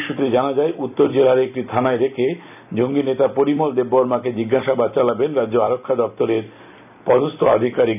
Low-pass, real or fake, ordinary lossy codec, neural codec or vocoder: 3.6 kHz; fake; none; vocoder, 44.1 kHz, 128 mel bands every 256 samples, BigVGAN v2